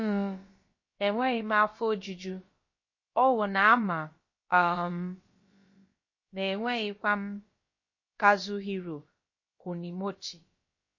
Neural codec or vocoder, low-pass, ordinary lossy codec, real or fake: codec, 16 kHz, about 1 kbps, DyCAST, with the encoder's durations; 7.2 kHz; MP3, 32 kbps; fake